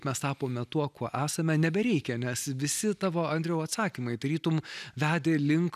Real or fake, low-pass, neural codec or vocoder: real; 14.4 kHz; none